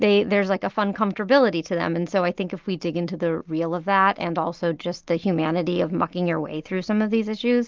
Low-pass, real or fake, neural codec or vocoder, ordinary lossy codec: 7.2 kHz; real; none; Opus, 32 kbps